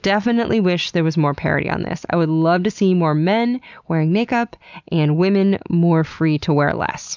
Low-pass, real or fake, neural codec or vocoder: 7.2 kHz; real; none